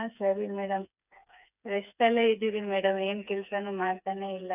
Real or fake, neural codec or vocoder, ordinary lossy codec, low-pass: fake; codec, 16 kHz, 4 kbps, FreqCodec, smaller model; none; 3.6 kHz